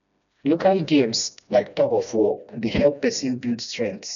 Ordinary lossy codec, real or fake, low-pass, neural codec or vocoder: none; fake; 7.2 kHz; codec, 16 kHz, 1 kbps, FreqCodec, smaller model